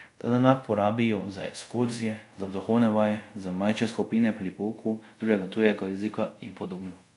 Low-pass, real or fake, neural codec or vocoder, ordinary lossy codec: 10.8 kHz; fake; codec, 24 kHz, 0.5 kbps, DualCodec; none